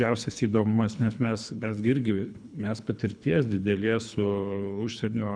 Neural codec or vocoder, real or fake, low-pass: codec, 24 kHz, 3 kbps, HILCodec; fake; 9.9 kHz